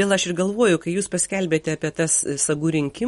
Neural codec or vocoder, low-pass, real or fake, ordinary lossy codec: none; 19.8 kHz; real; MP3, 48 kbps